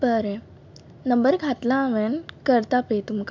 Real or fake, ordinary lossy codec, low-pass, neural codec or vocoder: real; MP3, 64 kbps; 7.2 kHz; none